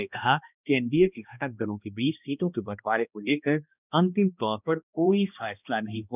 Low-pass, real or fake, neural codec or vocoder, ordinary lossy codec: 3.6 kHz; fake; codec, 16 kHz, 1 kbps, X-Codec, HuBERT features, trained on balanced general audio; none